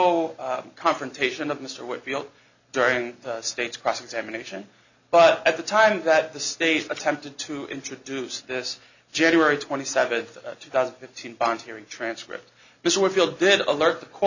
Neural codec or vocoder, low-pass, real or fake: none; 7.2 kHz; real